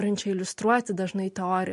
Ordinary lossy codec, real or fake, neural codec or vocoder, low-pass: MP3, 48 kbps; real; none; 14.4 kHz